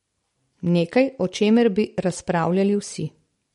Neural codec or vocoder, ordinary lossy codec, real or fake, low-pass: none; MP3, 48 kbps; real; 19.8 kHz